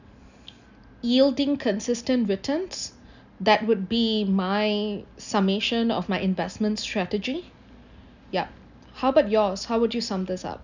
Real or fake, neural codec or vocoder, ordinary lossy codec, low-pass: real; none; none; 7.2 kHz